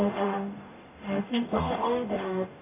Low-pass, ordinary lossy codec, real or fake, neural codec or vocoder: 3.6 kHz; none; fake; codec, 44.1 kHz, 0.9 kbps, DAC